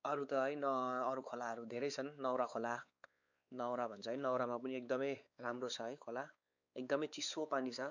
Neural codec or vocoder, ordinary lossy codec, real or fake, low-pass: codec, 16 kHz, 4 kbps, X-Codec, WavLM features, trained on Multilingual LibriSpeech; none; fake; 7.2 kHz